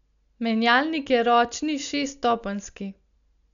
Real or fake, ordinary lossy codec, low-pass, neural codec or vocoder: real; none; 7.2 kHz; none